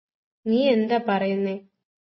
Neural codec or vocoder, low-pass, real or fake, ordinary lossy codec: none; 7.2 kHz; real; MP3, 24 kbps